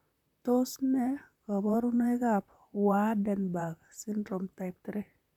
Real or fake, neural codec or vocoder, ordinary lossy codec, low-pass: fake; vocoder, 44.1 kHz, 128 mel bands, Pupu-Vocoder; none; 19.8 kHz